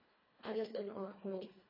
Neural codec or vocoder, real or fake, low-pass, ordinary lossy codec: codec, 24 kHz, 1.5 kbps, HILCodec; fake; 5.4 kHz; MP3, 24 kbps